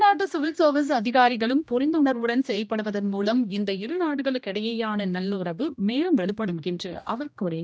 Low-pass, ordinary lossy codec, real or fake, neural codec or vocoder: none; none; fake; codec, 16 kHz, 1 kbps, X-Codec, HuBERT features, trained on general audio